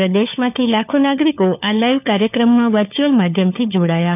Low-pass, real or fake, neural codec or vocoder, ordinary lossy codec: 3.6 kHz; fake; codec, 16 kHz, 4 kbps, FunCodec, trained on LibriTTS, 50 frames a second; none